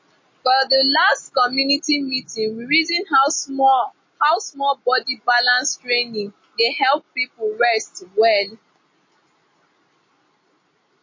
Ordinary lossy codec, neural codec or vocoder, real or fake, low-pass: MP3, 32 kbps; none; real; 7.2 kHz